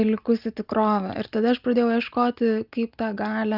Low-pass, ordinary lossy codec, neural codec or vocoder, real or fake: 5.4 kHz; Opus, 24 kbps; none; real